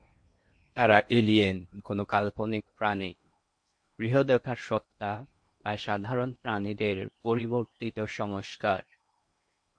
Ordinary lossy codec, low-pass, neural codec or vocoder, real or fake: MP3, 48 kbps; 9.9 kHz; codec, 16 kHz in and 24 kHz out, 0.8 kbps, FocalCodec, streaming, 65536 codes; fake